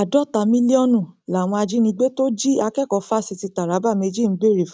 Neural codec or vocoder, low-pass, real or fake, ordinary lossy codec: none; none; real; none